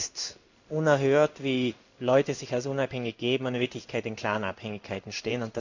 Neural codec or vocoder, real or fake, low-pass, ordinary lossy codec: codec, 16 kHz in and 24 kHz out, 1 kbps, XY-Tokenizer; fake; 7.2 kHz; none